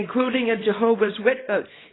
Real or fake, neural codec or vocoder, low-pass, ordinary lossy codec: fake; codec, 24 kHz, 0.9 kbps, WavTokenizer, small release; 7.2 kHz; AAC, 16 kbps